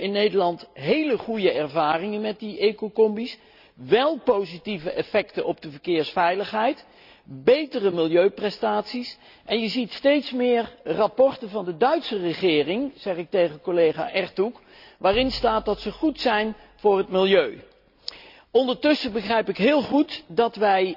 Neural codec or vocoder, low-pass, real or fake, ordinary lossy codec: none; 5.4 kHz; real; none